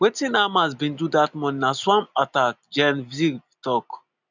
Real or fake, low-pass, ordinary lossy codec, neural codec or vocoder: real; 7.2 kHz; none; none